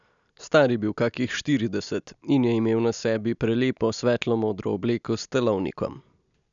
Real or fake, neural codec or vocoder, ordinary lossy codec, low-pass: real; none; none; 7.2 kHz